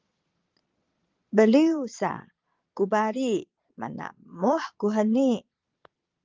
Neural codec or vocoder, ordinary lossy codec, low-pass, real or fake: vocoder, 22.05 kHz, 80 mel bands, Vocos; Opus, 32 kbps; 7.2 kHz; fake